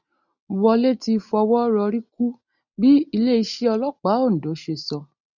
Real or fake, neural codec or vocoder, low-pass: real; none; 7.2 kHz